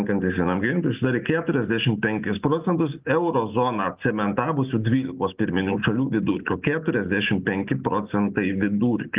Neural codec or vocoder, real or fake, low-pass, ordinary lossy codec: none; real; 3.6 kHz; Opus, 24 kbps